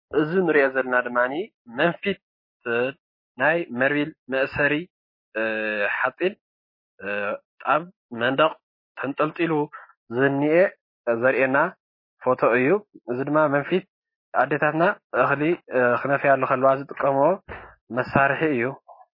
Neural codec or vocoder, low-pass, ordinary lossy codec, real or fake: none; 5.4 kHz; MP3, 24 kbps; real